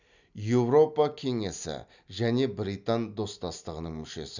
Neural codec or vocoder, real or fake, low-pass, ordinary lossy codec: none; real; 7.2 kHz; none